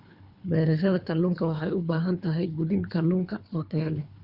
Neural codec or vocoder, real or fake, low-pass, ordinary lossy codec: codec, 24 kHz, 3 kbps, HILCodec; fake; 5.4 kHz; Opus, 64 kbps